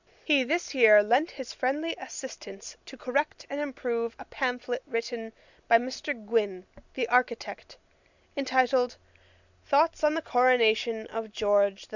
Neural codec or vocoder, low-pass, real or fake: none; 7.2 kHz; real